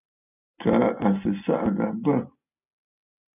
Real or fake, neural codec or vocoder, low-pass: real; none; 3.6 kHz